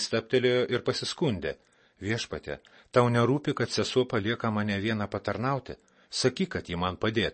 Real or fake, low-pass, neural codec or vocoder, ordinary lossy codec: real; 10.8 kHz; none; MP3, 32 kbps